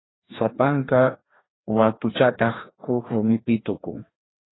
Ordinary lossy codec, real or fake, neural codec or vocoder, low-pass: AAC, 16 kbps; fake; codec, 16 kHz, 1 kbps, FreqCodec, larger model; 7.2 kHz